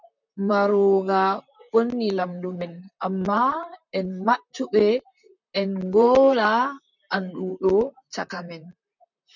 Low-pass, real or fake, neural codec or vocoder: 7.2 kHz; fake; vocoder, 44.1 kHz, 128 mel bands, Pupu-Vocoder